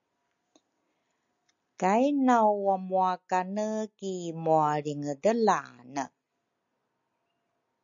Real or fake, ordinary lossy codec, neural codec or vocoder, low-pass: real; AAC, 64 kbps; none; 7.2 kHz